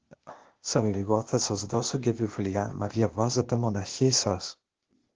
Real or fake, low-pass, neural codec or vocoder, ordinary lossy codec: fake; 7.2 kHz; codec, 16 kHz, 0.8 kbps, ZipCodec; Opus, 16 kbps